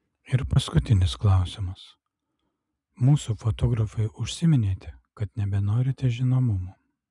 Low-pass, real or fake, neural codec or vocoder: 10.8 kHz; real; none